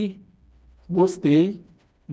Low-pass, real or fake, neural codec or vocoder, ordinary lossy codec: none; fake; codec, 16 kHz, 2 kbps, FreqCodec, smaller model; none